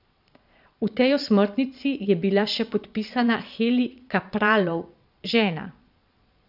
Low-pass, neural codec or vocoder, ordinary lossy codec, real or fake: 5.4 kHz; vocoder, 22.05 kHz, 80 mel bands, Vocos; none; fake